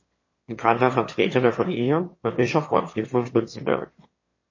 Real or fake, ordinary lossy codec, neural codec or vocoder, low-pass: fake; MP3, 32 kbps; autoencoder, 22.05 kHz, a latent of 192 numbers a frame, VITS, trained on one speaker; 7.2 kHz